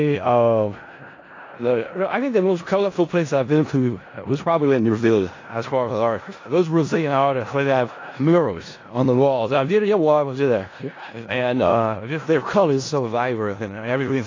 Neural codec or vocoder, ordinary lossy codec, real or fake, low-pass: codec, 16 kHz in and 24 kHz out, 0.4 kbps, LongCat-Audio-Codec, four codebook decoder; AAC, 32 kbps; fake; 7.2 kHz